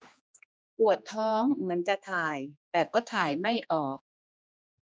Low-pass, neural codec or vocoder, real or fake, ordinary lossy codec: none; codec, 16 kHz, 2 kbps, X-Codec, HuBERT features, trained on general audio; fake; none